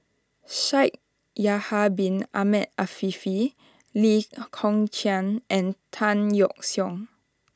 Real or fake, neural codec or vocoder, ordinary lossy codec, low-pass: real; none; none; none